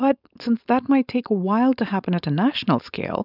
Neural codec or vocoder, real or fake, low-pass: codec, 16 kHz, 4.8 kbps, FACodec; fake; 5.4 kHz